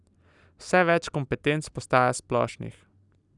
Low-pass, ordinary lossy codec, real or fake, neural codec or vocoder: 10.8 kHz; none; real; none